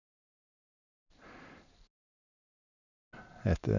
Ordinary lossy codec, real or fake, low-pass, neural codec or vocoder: none; fake; 7.2 kHz; vocoder, 44.1 kHz, 128 mel bands every 512 samples, BigVGAN v2